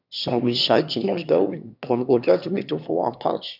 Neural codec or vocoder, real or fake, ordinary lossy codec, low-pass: autoencoder, 22.05 kHz, a latent of 192 numbers a frame, VITS, trained on one speaker; fake; none; 5.4 kHz